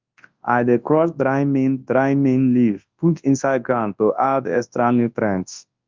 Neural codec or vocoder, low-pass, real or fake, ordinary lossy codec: codec, 24 kHz, 0.9 kbps, WavTokenizer, large speech release; 7.2 kHz; fake; Opus, 24 kbps